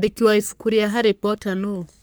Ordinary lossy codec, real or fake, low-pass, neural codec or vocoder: none; fake; none; codec, 44.1 kHz, 3.4 kbps, Pupu-Codec